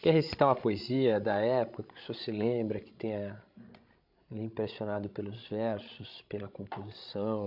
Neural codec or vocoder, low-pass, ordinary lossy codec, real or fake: codec, 16 kHz, 16 kbps, FreqCodec, larger model; 5.4 kHz; MP3, 48 kbps; fake